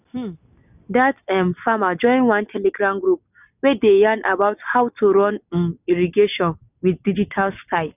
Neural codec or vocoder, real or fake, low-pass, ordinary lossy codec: none; real; 3.6 kHz; none